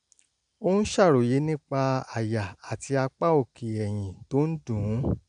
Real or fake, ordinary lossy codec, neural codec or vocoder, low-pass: real; none; none; 9.9 kHz